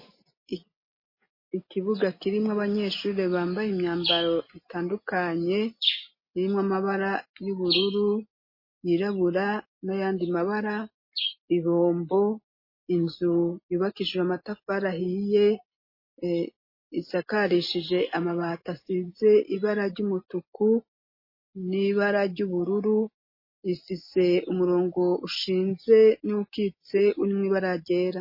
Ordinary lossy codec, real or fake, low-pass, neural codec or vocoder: MP3, 24 kbps; real; 5.4 kHz; none